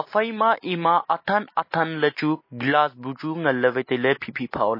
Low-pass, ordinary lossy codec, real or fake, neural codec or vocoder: 5.4 kHz; MP3, 24 kbps; real; none